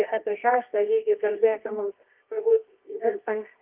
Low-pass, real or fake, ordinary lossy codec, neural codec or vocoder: 3.6 kHz; fake; Opus, 32 kbps; codec, 24 kHz, 0.9 kbps, WavTokenizer, medium music audio release